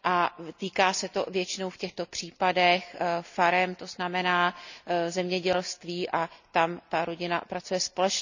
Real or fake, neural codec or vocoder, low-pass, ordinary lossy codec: real; none; 7.2 kHz; none